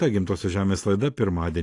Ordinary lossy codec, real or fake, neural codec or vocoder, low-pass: AAC, 48 kbps; real; none; 10.8 kHz